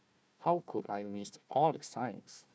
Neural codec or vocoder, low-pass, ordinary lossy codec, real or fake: codec, 16 kHz, 1 kbps, FunCodec, trained on Chinese and English, 50 frames a second; none; none; fake